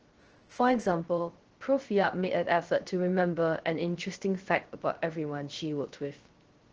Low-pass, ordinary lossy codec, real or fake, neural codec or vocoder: 7.2 kHz; Opus, 16 kbps; fake; codec, 16 kHz, 0.3 kbps, FocalCodec